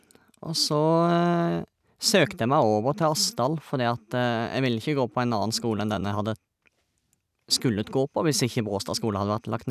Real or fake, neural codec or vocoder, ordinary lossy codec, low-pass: real; none; none; 14.4 kHz